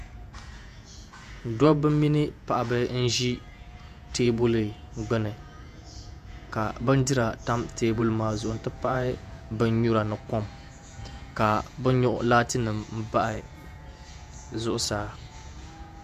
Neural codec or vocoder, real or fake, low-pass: vocoder, 48 kHz, 128 mel bands, Vocos; fake; 14.4 kHz